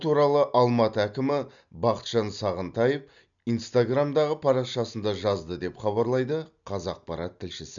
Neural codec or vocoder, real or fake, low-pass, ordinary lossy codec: none; real; 7.2 kHz; none